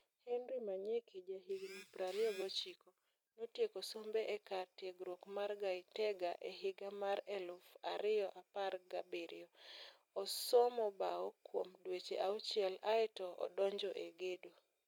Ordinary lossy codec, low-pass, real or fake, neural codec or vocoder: MP3, 96 kbps; 19.8 kHz; fake; vocoder, 44.1 kHz, 128 mel bands every 256 samples, BigVGAN v2